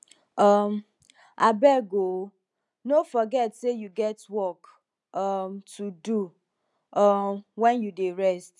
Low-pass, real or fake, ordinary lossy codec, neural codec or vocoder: none; real; none; none